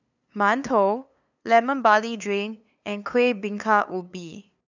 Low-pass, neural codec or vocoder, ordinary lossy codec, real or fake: 7.2 kHz; codec, 16 kHz, 2 kbps, FunCodec, trained on LibriTTS, 25 frames a second; none; fake